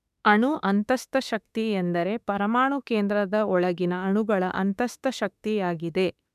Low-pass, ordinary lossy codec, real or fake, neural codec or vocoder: 14.4 kHz; none; fake; autoencoder, 48 kHz, 32 numbers a frame, DAC-VAE, trained on Japanese speech